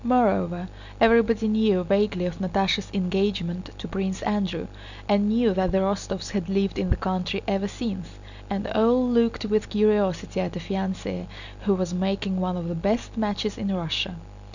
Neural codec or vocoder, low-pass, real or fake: none; 7.2 kHz; real